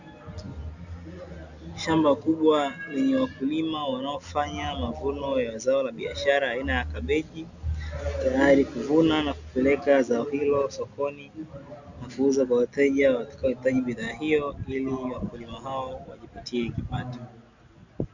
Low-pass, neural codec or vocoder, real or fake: 7.2 kHz; none; real